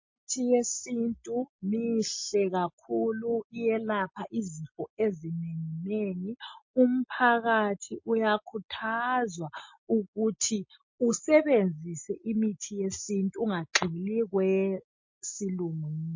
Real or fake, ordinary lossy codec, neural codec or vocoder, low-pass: real; MP3, 32 kbps; none; 7.2 kHz